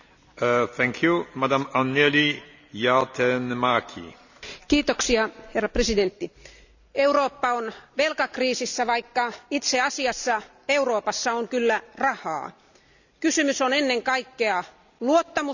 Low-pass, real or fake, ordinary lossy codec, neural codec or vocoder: 7.2 kHz; real; none; none